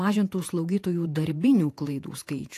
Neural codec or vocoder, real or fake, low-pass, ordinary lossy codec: vocoder, 48 kHz, 128 mel bands, Vocos; fake; 14.4 kHz; AAC, 64 kbps